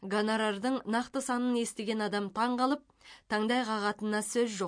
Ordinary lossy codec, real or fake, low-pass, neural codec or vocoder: MP3, 48 kbps; real; 9.9 kHz; none